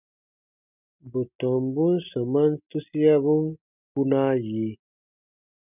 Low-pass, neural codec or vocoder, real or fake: 3.6 kHz; none; real